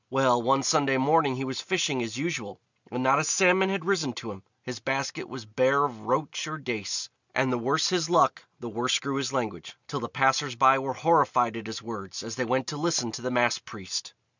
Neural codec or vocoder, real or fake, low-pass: none; real; 7.2 kHz